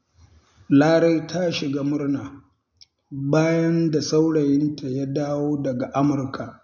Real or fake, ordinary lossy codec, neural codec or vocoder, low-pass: real; none; none; 7.2 kHz